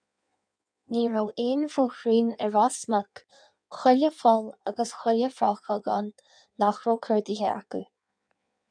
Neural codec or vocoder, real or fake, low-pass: codec, 16 kHz in and 24 kHz out, 1.1 kbps, FireRedTTS-2 codec; fake; 9.9 kHz